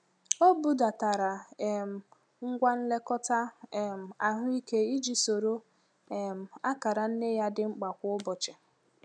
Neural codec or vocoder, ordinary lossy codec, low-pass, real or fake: none; none; 9.9 kHz; real